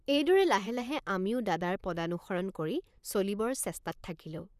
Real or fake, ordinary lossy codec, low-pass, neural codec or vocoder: fake; none; 14.4 kHz; vocoder, 44.1 kHz, 128 mel bands, Pupu-Vocoder